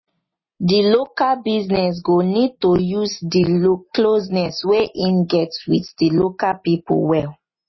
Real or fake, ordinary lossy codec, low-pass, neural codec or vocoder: real; MP3, 24 kbps; 7.2 kHz; none